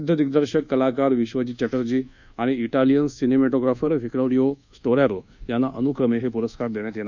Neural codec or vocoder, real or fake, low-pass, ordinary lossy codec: codec, 24 kHz, 1.2 kbps, DualCodec; fake; 7.2 kHz; none